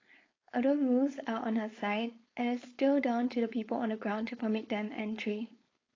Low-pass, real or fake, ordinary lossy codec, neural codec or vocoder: 7.2 kHz; fake; AAC, 32 kbps; codec, 16 kHz, 4.8 kbps, FACodec